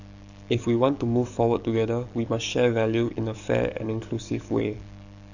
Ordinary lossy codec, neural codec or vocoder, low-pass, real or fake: none; codec, 44.1 kHz, 7.8 kbps, DAC; 7.2 kHz; fake